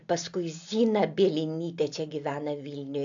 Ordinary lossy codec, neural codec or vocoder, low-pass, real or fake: AAC, 64 kbps; none; 7.2 kHz; real